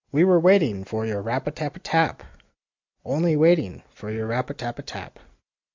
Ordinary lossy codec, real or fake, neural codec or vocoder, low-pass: MP3, 64 kbps; real; none; 7.2 kHz